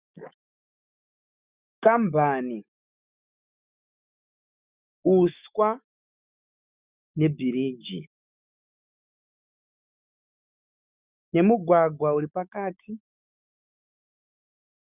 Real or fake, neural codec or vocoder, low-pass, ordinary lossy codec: fake; autoencoder, 48 kHz, 128 numbers a frame, DAC-VAE, trained on Japanese speech; 3.6 kHz; Opus, 64 kbps